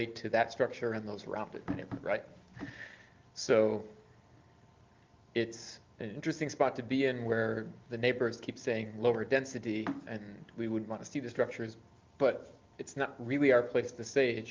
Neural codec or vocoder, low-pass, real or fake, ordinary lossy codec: none; 7.2 kHz; real; Opus, 24 kbps